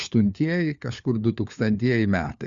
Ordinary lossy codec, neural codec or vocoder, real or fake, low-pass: Opus, 64 kbps; codec, 16 kHz, 4 kbps, FunCodec, trained on LibriTTS, 50 frames a second; fake; 7.2 kHz